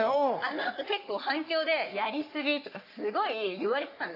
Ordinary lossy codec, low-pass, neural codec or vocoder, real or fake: AAC, 32 kbps; 5.4 kHz; codec, 44.1 kHz, 3.4 kbps, Pupu-Codec; fake